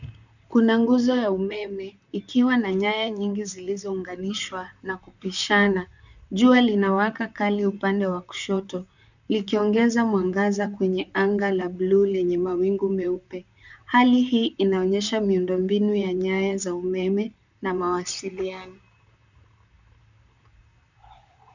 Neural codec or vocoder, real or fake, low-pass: vocoder, 44.1 kHz, 128 mel bands, Pupu-Vocoder; fake; 7.2 kHz